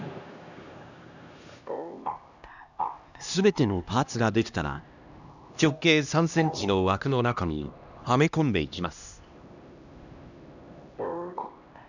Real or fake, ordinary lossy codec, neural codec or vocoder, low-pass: fake; none; codec, 16 kHz, 1 kbps, X-Codec, HuBERT features, trained on LibriSpeech; 7.2 kHz